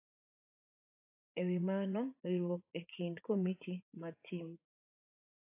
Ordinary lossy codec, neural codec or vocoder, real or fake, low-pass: AAC, 24 kbps; vocoder, 44.1 kHz, 80 mel bands, Vocos; fake; 3.6 kHz